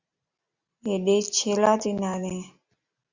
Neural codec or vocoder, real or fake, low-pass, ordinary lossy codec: none; real; 7.2 kHz; Opus, 64 kbps